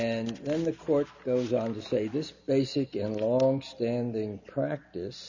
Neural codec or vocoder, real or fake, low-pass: none; real; 7.2 kHz